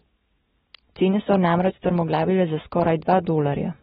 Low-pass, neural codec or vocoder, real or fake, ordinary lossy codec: 19.8 kHz; none; real; AAC, 16 kbps